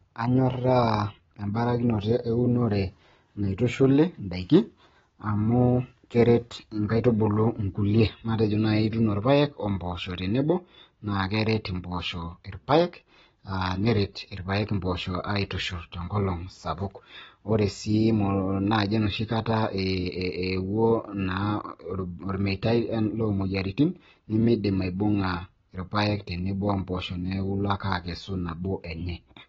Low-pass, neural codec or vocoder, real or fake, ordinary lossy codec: 7.2 kHz; none; real; AAC, 24 kbps